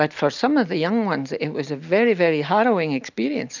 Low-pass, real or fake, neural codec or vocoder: 7.2 kHz; real; none